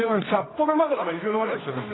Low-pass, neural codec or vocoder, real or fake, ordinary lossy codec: 7.2 kHz; codec, 24 kHz, 0.9 kbps, WavTokenizer, medium music audio release; fake; AAC, 16 kbps